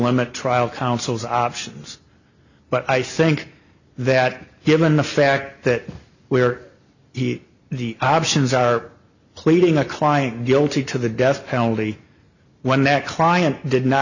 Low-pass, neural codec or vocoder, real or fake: 7.2 kHz; none; real